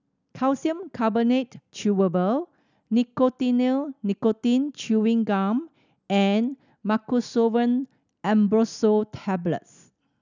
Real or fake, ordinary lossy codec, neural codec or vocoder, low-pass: real; none; none; 7.2 kHz